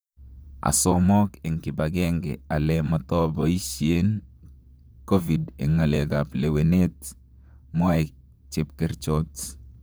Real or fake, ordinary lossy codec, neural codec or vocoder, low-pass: fake; none; vocoder, 44.1 kHz, 128 mel bands, Pupu-Vocoder; none